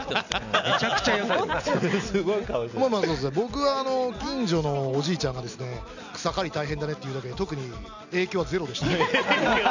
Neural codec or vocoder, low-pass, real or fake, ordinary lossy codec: none; 7.2 kHz; real; none